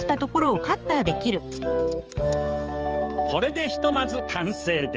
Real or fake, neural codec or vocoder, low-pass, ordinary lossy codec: fake; codec, 16 kHz, 4 kbps, X-Codec, HuBERT features, trained on balanced general audio; 7.2 kHz; Opus, 24 kbps